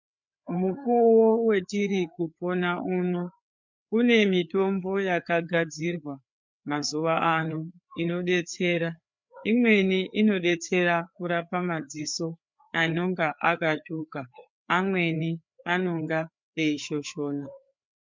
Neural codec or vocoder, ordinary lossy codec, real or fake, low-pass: codec, 16 kHz, 4 kbps, FreqCodec, larger model; MP3, 64 kbps; fake; 7.2 kHz